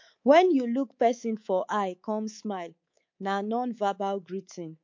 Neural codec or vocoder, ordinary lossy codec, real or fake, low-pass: codec, 24 kHz, 3.1 kbps, DualCodec; MP3, 48 kbps; fake; 7.2 kHz